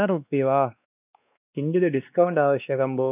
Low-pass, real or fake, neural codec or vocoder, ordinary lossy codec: 3.6 kHz; fake; codec, 16 kHz, 2 kbps, X-Codec, WavLM features, trained on Multilingual LibriSpeech; none